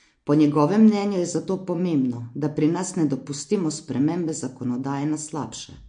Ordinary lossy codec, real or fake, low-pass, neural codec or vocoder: MP3, 48 kbps; real; 9.9 kHz; none